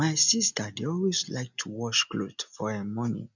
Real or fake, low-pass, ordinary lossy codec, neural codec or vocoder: real; 7.2 kHz; none; none